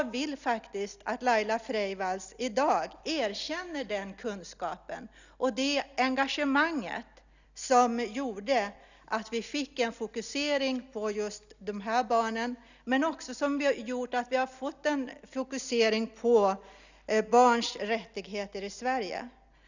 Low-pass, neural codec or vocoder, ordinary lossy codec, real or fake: 7.2 kHz; none; none; real